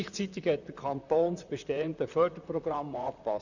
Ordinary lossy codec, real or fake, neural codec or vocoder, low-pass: none; fake; vocoder, 44.1 kHz, 128 mel bands, Pupu-Vocoder; 7.2 kHz